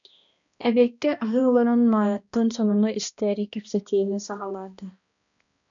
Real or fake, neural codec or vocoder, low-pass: fake; codec, 16 kHz, 1 kbps, X-Codec, HuBERT features, trained on balanced general audio; 7.2 kHz